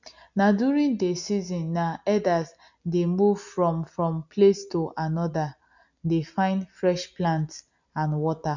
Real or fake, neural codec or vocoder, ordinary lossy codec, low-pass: real; none; none; 7.2 kHz